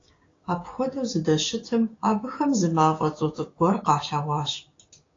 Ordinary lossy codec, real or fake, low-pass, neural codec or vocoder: AAC, 32 kbps; fake; 7.2 kHz; codec, 16 kHz, 6 kbps, DAC